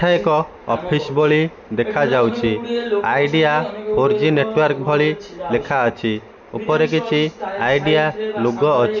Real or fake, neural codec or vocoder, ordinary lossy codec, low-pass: real; none; none; 7.2 kHz